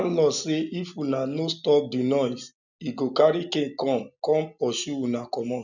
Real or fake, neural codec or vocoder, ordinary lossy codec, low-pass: real; none; none; 7.2 kHz